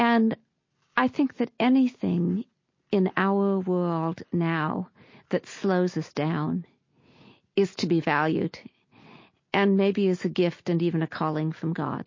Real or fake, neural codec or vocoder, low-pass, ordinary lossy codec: real; none; 7.2 kHz; MP3, 32 kbps